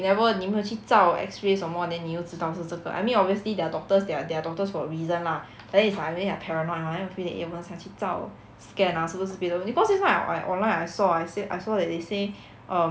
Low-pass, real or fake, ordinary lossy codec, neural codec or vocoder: none; real; none; none